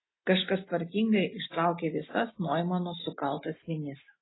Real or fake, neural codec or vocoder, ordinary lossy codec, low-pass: real; none; AAC, 16 kbps; 7.2 kHz